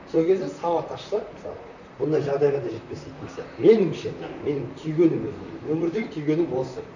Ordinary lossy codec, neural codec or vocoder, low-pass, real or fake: none; vocoder, 44.1 kHz, 128 mel bands, Pupu-Vocoder; 7.2 kHz; fake